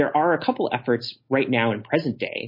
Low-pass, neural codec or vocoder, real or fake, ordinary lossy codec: 5.4 kHz; none; real; MP3, 24 kbps